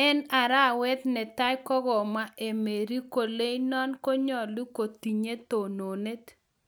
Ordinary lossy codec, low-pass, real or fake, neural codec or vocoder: none; none; real; none